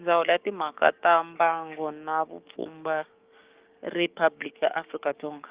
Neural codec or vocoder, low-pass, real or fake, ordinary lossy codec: codec, 16 kHz, 6 kbps, DAC; 3.6 kHz; fake; Opus, 32 kbps